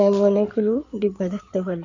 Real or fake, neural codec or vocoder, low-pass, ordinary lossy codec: fake; codec, 16 kHz in and 24 kHz out, 2.2 kbps, FireRedTTS-2 codec; 7.2 kHz; none